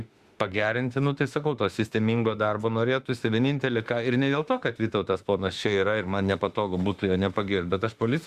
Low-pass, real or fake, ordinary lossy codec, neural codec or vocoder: 14.4 kHz; fake; Opus, 64 kbps; autoencoder, 48 kHz, 32 numbers a frame, DAC-VAE, trained on Japanese speech